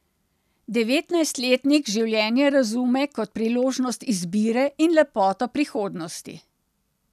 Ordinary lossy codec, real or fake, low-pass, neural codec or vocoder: none; real; 14.4 kHz; none